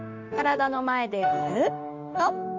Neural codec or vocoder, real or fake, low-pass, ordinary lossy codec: autoencoder, 48 kHz, 32 numbers a frame, DAC-VAE, trained on Japanese speech; fake; 7.2 kHz; MP3, 64 kbps